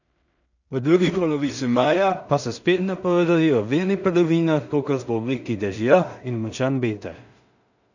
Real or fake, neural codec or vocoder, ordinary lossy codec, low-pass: fake; codec, 16 kHz in and 24 kHz out, 0.4 kbps, LongCat-Audio-Codec, two codebook decoder; none; 7.2 kHz